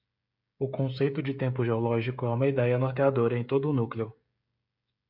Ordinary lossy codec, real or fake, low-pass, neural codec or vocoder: MP3, 48 kbps; fake; 5.4 kHz; codec, 16 kHz, 16 kbps, FreqCodec, smaller model